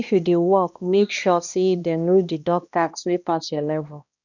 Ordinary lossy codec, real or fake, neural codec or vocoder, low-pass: none; fake; codec, 16 kHz, 1 kbps, X-Codec, HuBERT features, trained on balanced general audio; 7.2 kHz